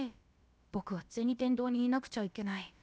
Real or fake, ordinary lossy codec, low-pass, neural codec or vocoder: fake; none; none; codec, 16 kHz, about 1 kbps, DyCAST, with the encoder's durations